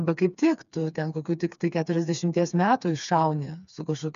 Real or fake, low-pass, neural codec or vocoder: fake; 7.2 kHz; codec, 16 kHz, 4 kbps, FreqCodec, smaller model